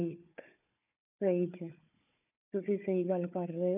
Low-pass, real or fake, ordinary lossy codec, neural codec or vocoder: 3.6 kHz; fake; none; codec, 16 kHz, 4 kbps, FunCodec, trained on Chinese and English, 50 frames a second